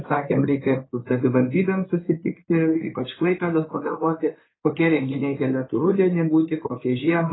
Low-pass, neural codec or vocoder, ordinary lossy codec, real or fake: 7.2 kHz; codec, 16 kHz in and 24 kHz out, 2.2 kbps, FireRedTTS-2 codec; AAC, 16 kbps; fake